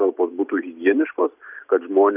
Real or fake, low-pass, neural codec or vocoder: real; 3.6 kHz; none